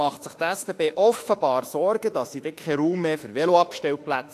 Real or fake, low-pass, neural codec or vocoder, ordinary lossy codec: fake; 14.4 kHz; autoencoder, 48 kHz, 32 numbers a frame, DAC-VAE, trained on Japanese speech; AAC, 64 kbps